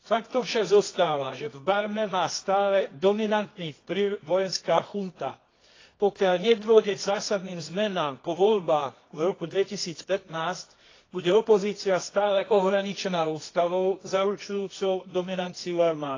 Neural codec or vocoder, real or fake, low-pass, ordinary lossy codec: codec, 24 kHz, 0.9 kbps, WavTokenizer, medium music audio release; fake; 7.2 kHz; AAC, 32 kbps